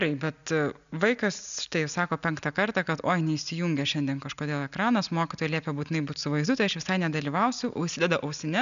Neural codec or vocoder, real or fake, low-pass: none; real; 7.2 kHz